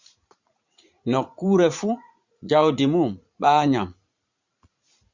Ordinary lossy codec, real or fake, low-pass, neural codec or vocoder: Opus, 64 kbps; fake; 7.2 kHz; vocoder, 44.1 kHz, 80 mel bands, Vocos